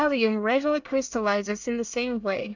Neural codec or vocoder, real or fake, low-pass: codec, 24 kHz, 1 kbps, SNAC; fake; 7.2 kHz